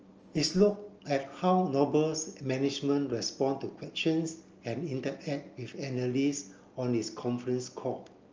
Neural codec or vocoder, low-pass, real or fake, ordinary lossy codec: none; 7.2 kHz; real; Opus, 24 kbps